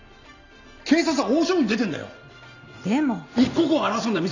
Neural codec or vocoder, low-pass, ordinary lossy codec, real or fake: none; 7.2 kHz; none; real